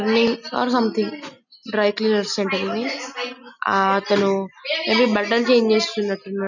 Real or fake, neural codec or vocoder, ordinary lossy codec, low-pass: real; none; none; 7.2 kHz